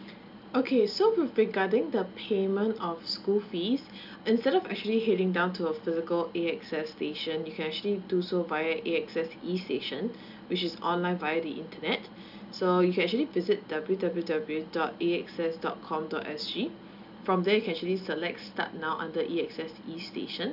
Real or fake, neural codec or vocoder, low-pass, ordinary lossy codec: real; none; 5.4 kHz; none